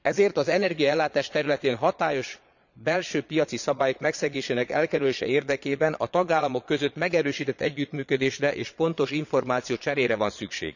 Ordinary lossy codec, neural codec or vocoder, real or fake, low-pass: none; vocoder, 22.05 kHz, 80 mel bands, Vocos; fake; 7.2 kHz